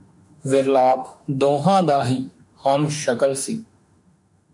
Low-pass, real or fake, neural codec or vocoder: 10.8 kHz; fake; autoencoder, 48 kHz, 32 numbers a frame, DAC-VAE, trained on Japanese speech